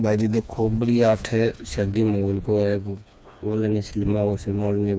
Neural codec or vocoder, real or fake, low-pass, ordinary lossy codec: codec, 16 kHz, 2 kbps, FreqCodec, smaller model; fake; none; none